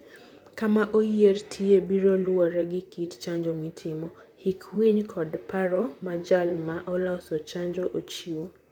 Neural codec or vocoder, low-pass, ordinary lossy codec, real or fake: vocoder, 44.1 kHz, 128 mel bands, Pupu-Vocoder; 19.8 kHz; none; fake